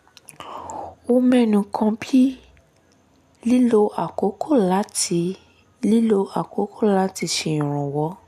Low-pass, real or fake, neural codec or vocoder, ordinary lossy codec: 14.4 kHz; real; none; none